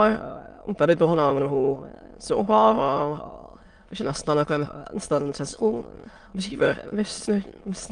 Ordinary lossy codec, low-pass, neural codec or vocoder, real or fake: Opus, 32 kbps; 9.9 kHz; autoencoder, 22.05 kHz, a latent of 192 numbers a frame, VITS, trained on many speakers; fake